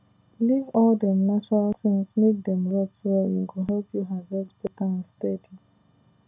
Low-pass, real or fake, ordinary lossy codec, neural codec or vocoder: 3.6 kHz; real; none; none